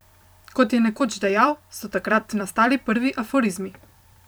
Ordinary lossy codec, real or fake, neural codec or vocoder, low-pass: none; fake; vocoder, 44.1 kHz, 128 mel bands every 256 samples, BigVGAN v2; none